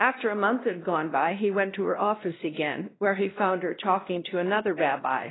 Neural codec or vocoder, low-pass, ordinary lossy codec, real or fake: codec, 16 kHz, 1 kbps, X-Codec, WavLM features, trained on Multilingual LibriSpeech; 7.2 kHz; AAC, 16 kbps; fake